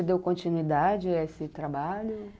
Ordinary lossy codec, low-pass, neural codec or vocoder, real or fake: none; none; none; real